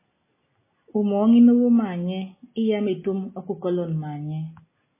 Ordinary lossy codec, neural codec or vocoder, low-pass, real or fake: MP3, 16 kbps; none; 3.6 kHz; real